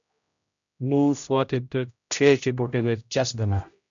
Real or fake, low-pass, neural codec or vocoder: fake; 7.2 kHz; codec, 16 kHz, 0.5 kbps, X-Codec, HuBERT features, trained on general audio